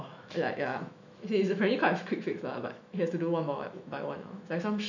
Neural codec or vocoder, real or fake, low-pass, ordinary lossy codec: autoencoder, 48 kHz, 128 numbers a frame, DAC-VAE, trained on Japanese speech; fake; 7.2 kHz; none